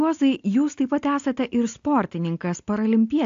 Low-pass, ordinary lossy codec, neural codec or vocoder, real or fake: 7.2 kHz; AAC, 48 kbps; none; real